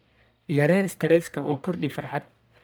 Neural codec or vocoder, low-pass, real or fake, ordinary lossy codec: codec, 44.1 kHz, 1.7 kbps, Pupu-Codec; none; fake; none